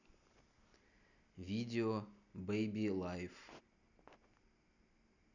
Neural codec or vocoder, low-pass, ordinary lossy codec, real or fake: none; 7.2 kHz; none; real